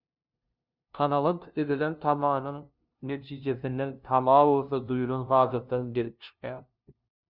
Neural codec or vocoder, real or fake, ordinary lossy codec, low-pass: codec, 16 kHz, 0.5 kbps, FunCodec, trained on LibriTTS, 25 frames a second; fake; Opus, 64 kbps; 5.4 kHz